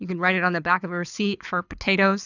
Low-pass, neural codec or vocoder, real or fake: 7.2 kHz; codec, 24 kHz, 6 kbps, HILCodec; fake